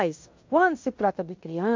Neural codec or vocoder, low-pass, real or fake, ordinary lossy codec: codec, 16 kHz in and 24 kHz out, 0.9 kbps, LongCat-Audio-Codec, fine tuned four codebook decoder; 7.2 kHz; fake; MP3, 48 kbps